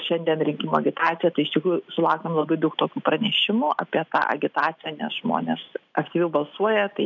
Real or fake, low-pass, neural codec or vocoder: real; 7.2 kHz; none